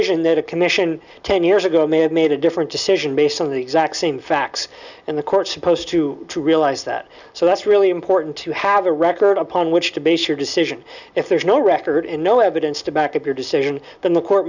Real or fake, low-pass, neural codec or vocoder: real; 7.2 kHz; none